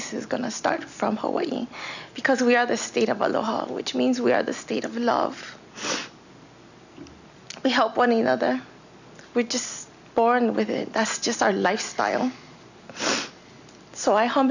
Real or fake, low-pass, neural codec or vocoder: real; 7.2 kHz; none